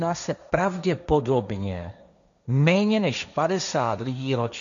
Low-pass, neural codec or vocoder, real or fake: 7.2 kHz; codec, 16 kHz, 1.1 kbps, Voila-Tokenizer; fake